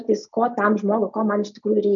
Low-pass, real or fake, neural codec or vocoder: 7.2 kHz; real; none